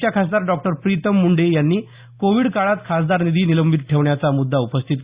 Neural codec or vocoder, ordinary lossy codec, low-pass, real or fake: none; Opus, 64 kbps; 3.6 kHz; real